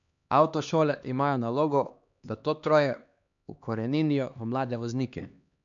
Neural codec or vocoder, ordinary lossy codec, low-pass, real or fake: codec, 16 kHz, 2 kbps, X-Codec, HuBERT features, trained on LibriSpeech; none; 7.2 kHz; fake